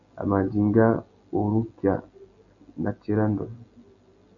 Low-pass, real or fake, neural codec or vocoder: 7.2 kHz; real; none